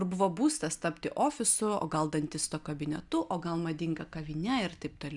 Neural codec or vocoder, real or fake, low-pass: none; real; 10.8 kHz